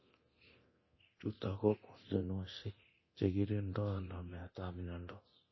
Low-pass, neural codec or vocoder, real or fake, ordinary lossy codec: 7.2 kHz; codec, 24 kHz, 0.9 kbps, DualCodec; fake; MP3, 24 kbps